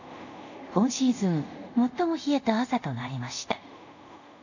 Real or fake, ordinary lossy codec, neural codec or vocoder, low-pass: fake; none; codec, 24 kHz, 0.5 kbps, DualCodec; 7.2 kHz